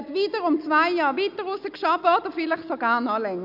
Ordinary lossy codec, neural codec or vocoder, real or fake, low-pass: none; none; real; 5.4 kHz